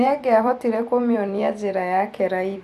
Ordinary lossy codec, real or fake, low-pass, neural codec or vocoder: none; fake; 14.4 kHz; vocoder, 44.1 kHz, 128 mel bands every 256 samples, BigVGAN v2